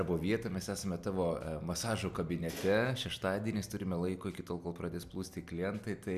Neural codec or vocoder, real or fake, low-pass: none; real; 14.4 kHz